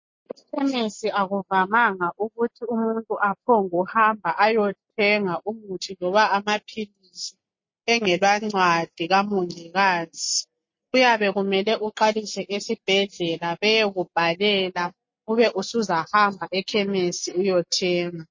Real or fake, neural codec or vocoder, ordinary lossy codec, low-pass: real; none; MP3, 32 kbps; 7.2 kHz